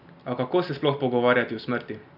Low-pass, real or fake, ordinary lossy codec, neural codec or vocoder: 5.4 kHz; real; none; none